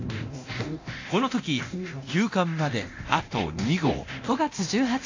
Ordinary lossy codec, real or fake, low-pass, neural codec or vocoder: AAC, 48 kbps; fake; 7.2 kHz; codec, 24 kHz, 0.9 kbps, DualCodec